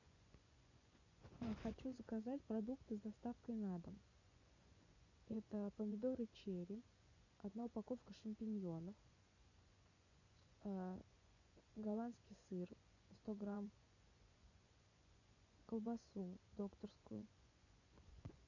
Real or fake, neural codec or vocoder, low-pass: fake; vocoder, 24 kHz, 100 mel bands, Vocos; 7.2 kHz